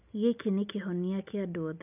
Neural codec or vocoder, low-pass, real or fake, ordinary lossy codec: none; 3.6 kHz; real; none